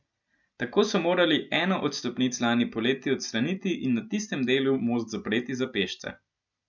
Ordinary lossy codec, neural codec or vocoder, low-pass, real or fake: none; none; 7.2 kHz; real